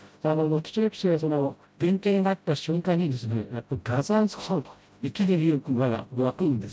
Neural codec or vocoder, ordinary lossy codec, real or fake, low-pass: codec, 16 kHz, 0.5 kbps, FreqCodec, smaller model; none; fake; none